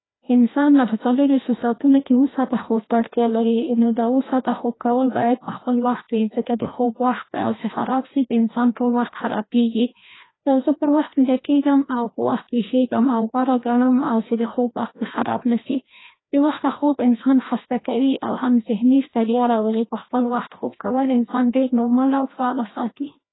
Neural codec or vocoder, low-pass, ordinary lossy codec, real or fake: codec, 16 kHz, 1 kbps, FreqCodec, larger model; 7.2 kHz; AAC, 16 kbps; fake